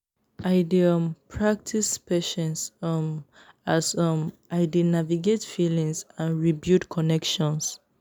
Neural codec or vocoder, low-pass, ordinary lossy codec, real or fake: none; none; none; real